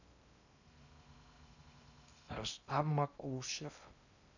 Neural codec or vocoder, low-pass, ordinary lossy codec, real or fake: codec, 16 kHz in and 24 kHz out, 0.6 kbps, FocalCodec, streaming, 2048 codes; 7.2 kHz; none; fake